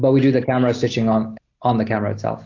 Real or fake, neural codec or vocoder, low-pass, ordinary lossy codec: real; none; 7.2 kHz; AAC, 48 kbps